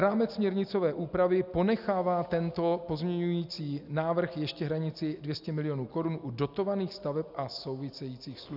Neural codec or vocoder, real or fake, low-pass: none; real; 5.4 kHz